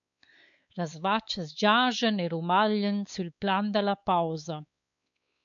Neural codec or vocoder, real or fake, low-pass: codec, 16 kHz, 4 kbps, X-Codec, WavLM features, trained on Multilingual LibriSpeech; fake; 7.2 kHz